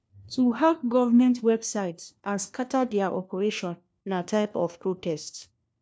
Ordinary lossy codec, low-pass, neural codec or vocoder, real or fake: none; none; codec, 16 kHz, 1 kbps, FunCodec, trained on LibriTTS, 50 frames a second; fake